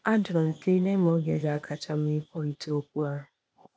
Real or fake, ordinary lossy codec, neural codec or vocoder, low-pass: fake; none; codec, 16 kHz, 0.8 kbps, ZipCodec; none